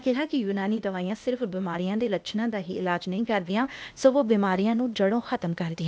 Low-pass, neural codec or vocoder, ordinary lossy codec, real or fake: none; codec, 16 kHz, 0.8 kbps, ZipCodec; none; fake